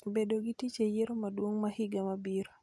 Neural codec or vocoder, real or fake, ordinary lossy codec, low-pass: none; real; none; none